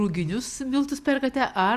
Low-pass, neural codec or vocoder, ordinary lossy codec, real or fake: 14.4 kHz; none; Opus, 64 kbps; real